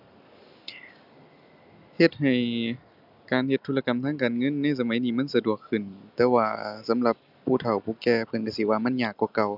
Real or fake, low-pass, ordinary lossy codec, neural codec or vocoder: real; 5.4 kHz; none; none